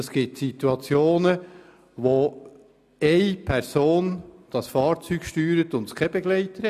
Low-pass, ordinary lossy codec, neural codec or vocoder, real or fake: 14.4 kHz; none; none; real